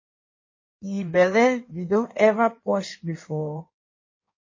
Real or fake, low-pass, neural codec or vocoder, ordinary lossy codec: fake; 7.2 kHz; codec, 16 kHz in and 24 kHz out, 1.1 kbps, FireRedTTS-2 codec; MP3, 32 kbps